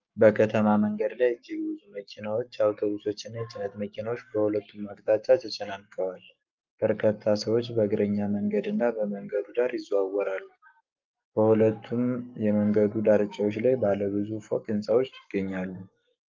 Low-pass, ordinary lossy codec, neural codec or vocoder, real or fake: 7.2 kHz; Opus, 24 kbps; codec, 44.1 kHz, 7.8 kbps, Pupu-Codec; fake